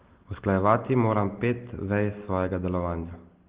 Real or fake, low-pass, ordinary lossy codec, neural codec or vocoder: real; 3.6 kHz; Opus, 16 kbps; none